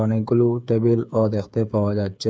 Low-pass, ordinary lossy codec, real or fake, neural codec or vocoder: none; none; fake; codec, 16 kHz, 8 kbps, FreqCodec, smaller model